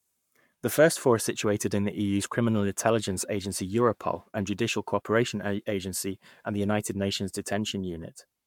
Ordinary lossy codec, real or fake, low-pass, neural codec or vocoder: MP3, 96 kbps; fake; 19.8 kHz; codec, 44.1 kHz, 7.8 kbps, Pupu-Codec